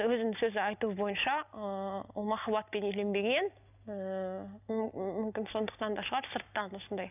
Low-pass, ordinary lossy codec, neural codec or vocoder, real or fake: 3.6 kHz; none; none; real